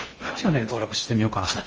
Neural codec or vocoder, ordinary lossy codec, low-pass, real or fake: codec, 16 kHz in and 24 kHz out, 0.6 kbps, FocalCodec, streaming, 4096 codes; Opus, 24 kbps; 7.2 kHz; fake